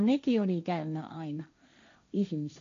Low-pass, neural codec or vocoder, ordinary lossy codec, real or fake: 7.2 kHz; codec, 16 kHz, 1.1 kbps, Voila-Tokenizer; AAC, 48 kbps; fake